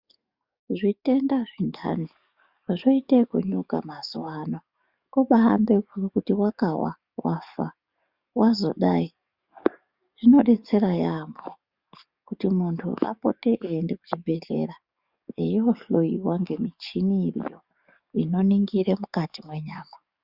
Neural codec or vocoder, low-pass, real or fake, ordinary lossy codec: codec, 44.1 kHz, 7.8 kbps, DAC; 5.4 kHz; fake; Opus, 64 kbps